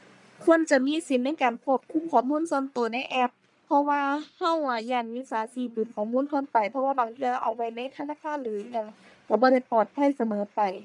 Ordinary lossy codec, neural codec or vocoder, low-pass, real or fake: none; codec, 44.1 kHz, 1.7 kbps, Pupu-Codec; 10.8 kHz; fake